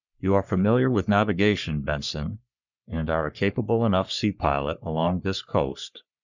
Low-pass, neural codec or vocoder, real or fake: 7.2 kHz; codec, 44.1 kHz, 3.4 kbps, Pupu-Codec; fake